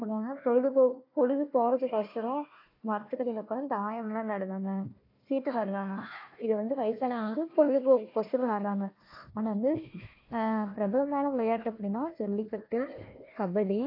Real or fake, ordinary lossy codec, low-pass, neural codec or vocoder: fake; AAC, 32 kbps; 5.4 kHz; codec, 16 kHz, 1 kbps, FunCodec, trained on Chinese and English, 50 frames a second